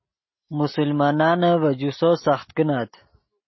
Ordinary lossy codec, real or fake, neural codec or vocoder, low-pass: MP3, 24 kbps; real; none; 7.2 kHz